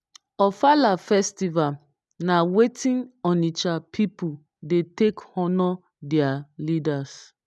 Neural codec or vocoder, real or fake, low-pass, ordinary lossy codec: none; real; none; none